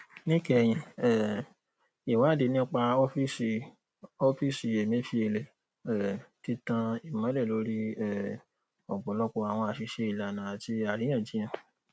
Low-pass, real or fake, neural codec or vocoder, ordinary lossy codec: none; real; none; none